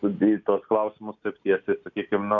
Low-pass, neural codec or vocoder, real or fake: 7.2 kHz; none; real